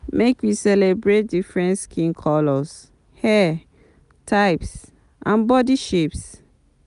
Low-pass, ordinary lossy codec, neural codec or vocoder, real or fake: 10.8 kHz; none; none; real